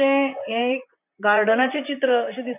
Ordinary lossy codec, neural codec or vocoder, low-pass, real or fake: none; vocoder, 44.1 kHz, 128 mel bands, Pupu-Vocoder; 3.6 kHz; fake